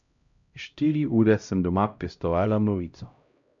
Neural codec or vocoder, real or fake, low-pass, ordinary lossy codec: codec, 16 kHz, 0.5 kbps, X-Codec, HuBERT features, trained on LibriSpeech; fake; 7.2 kHz; AAC, 64 kbps